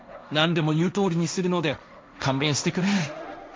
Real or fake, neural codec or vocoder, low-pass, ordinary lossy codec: fake; codec, 16 kHz, 1.1 kbps, Voila-Tokenizer; none; none